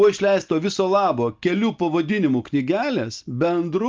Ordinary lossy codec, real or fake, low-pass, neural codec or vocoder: Opus, 24 kbps; real; 7.2 kHz; none